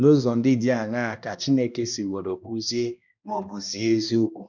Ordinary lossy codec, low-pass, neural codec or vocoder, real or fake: none; 7.2 kHz; codec, 16 kHz, 1 kbps, X-Codec, HuBERT features, trained on balanced general audio; fake